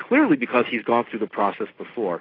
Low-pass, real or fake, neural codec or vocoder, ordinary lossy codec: 5.4 kHz; real; none; AAC, 24 kbps